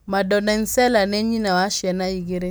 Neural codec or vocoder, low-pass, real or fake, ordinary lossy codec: none; none; real; none